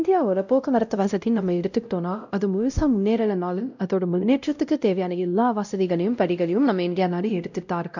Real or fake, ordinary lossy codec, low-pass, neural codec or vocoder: fake; none; 7.2 kHz; codec, 16 kHz, 0.5 kbps, X-Codec, WavLM features, trained on Multilingual LibriSpeech